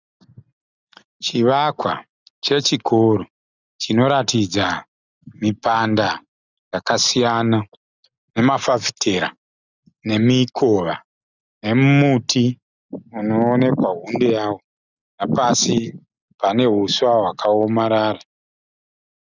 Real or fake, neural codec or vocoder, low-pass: real; none; 7.2 kHz